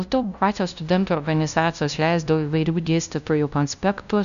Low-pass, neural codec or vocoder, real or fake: 7.2 kHz; codec, 16 kHz, 0.5 kbps, FunCodec, trained on LibriTTS, 25 frames a second; fake